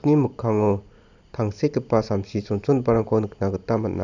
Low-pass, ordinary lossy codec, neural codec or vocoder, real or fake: 7.2 kHz; none; none; real